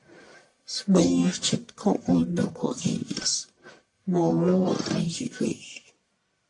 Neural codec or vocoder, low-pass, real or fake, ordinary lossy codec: codec, 44.1 kHz, 1.7 kbps, Pupu-Codec; 10.8 kHz; fake; AAC, 48 kbps